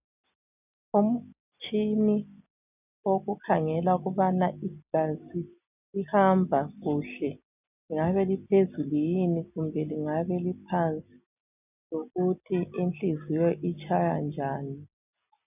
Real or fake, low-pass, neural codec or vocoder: real; 3.6 kHz; none